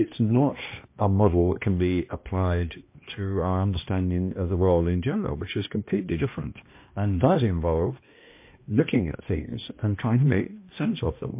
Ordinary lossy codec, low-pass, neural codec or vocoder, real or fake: MP3, 24 kbps; 3.6 kHz; codec, 16 kHz, 1 kbps, X-Codec, HuBERT features, trained on balanced general audio; fake